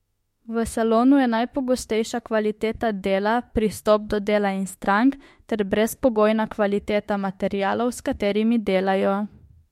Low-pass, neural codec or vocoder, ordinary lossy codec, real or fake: 19.8 kHz; autoencoder, 48 kHz, 32 numbers a frame, DAC-VAE, trained on Japanese speech; MP3, 64 kbps; fake